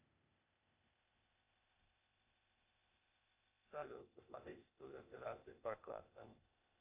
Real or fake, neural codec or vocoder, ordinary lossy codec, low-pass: fake; codec, 16 kHz, 0.8 kbps, ZipCodec; Opus, 64 kbps; 3.6 kHz